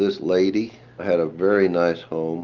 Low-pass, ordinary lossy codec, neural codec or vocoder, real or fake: 7.2 kHz; Opus, 32 kbps; none; real